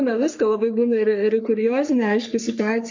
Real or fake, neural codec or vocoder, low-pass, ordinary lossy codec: fake; codec, 44.1 kHz, 3.4 kbps, Pupu-Codec; 7.2 kHz; MP3, 48 kbps